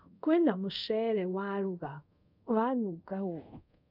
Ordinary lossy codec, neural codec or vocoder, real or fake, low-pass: none; codec, 24 kHz, 0.5 kbps, DualCodec; fake; 5.4 kHz